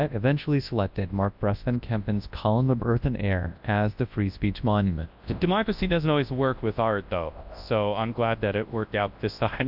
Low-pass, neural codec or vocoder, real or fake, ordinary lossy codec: 5.4 kHz; codec, 24 kHz, 0.9 kbps, WavTokenizer, large speech release; fake; AAC, 48 kbps